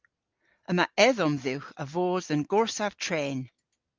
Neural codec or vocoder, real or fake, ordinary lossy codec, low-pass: none; real; Opus, 32 kbps; 7.2 kHz